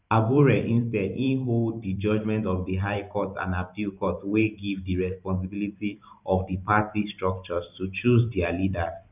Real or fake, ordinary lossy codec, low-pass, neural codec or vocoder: fake; none; 3.6 kHz; vocoder, 44.1 kHz, 128 mel bands every 512 samples, BigVGAN v2